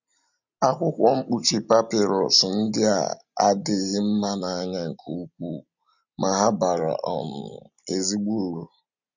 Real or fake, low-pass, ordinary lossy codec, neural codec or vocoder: real; 7.2 kHz; none; none